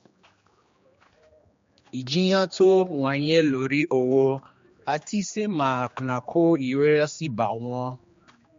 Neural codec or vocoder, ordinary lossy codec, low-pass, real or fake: codec, 16 kHz, 2 kbps, X-Codec, HuBERT features, trained on general audio; MP3, 48 kbps; 7.2 kHz; fake